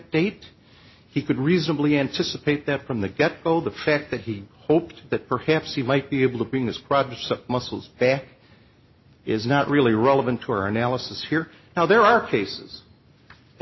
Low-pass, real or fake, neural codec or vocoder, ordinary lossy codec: 7.2 kHz; real; none; MP3, 24 kbps